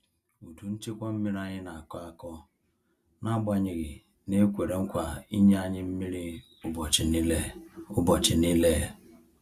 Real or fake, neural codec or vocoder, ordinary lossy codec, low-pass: real; none; Opus, 64 kbps; 14.4 kHz